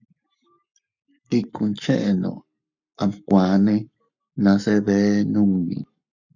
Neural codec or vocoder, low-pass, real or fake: codec, 44.1 kHz, 7.8 kbps, Pupu-Codec; 7.2 kHz; fake